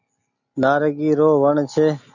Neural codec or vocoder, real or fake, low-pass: none; real; 7.2 kHz